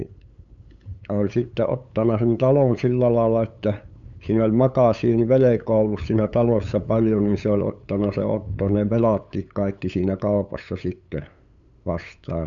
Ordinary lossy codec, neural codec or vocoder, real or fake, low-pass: none; codec, 16 kHz, 8 kbps, FunCodec, trained on LibriTTS, 25 frames a second; fake; 7.2 kHz